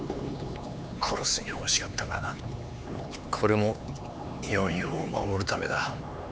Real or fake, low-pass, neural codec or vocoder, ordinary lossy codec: fake; none; codec, 16 kHz, 4 kbps, X-Codec, HuBERT features, trained on LibriSpeech; none